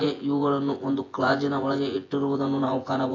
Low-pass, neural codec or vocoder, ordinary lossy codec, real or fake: 7.2 kHz; vocoder, 24 kHz, 100 mel bands, Vocos; AAC, 32 kbps; fake